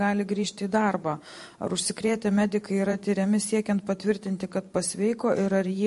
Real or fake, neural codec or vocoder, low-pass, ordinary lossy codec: fake; vocoder, 44.1 kHz, 128 mel bands every 512 samples, BigVGAN v2; 14.4 kHz; MP3, 48 kbps